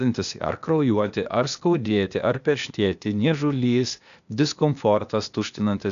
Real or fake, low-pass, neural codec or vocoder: fake; 7.2 kHz; codec, 16 kHz, 0.8 kbps, ZipCodec